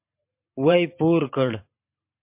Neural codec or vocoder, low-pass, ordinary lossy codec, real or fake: vocoder, 44.1 kHz, 128 mel bands every 512 samples, BigVGAN v2; 3.6 kHz; MP3, 32 kbps; fake